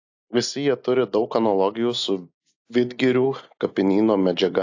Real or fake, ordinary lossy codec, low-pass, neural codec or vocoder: real; AAC, 48 kbps; 7.2 kHz; none